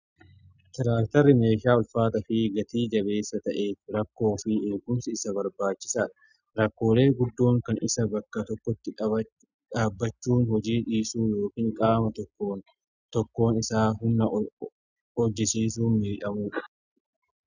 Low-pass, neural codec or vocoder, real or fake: 7.2 kHz; none; real